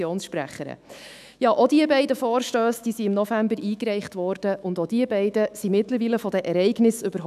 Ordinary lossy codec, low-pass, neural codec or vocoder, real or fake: none; 14.4 kHz; autoencoder, 48 kHz, 128 numbers a frame, DAC-VAE, trained on Japanese speech; fake